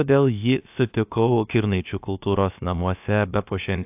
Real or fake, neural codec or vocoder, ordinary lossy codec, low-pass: fake; codec, 16 kHz, about 1 kbps, DyCAST, with the encoder's durations; AAC, 32 kbps; 3.6 kHz